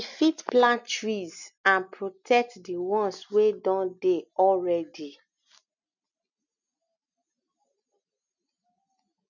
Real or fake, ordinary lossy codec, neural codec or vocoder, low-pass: real; none; none; 7.2 kHz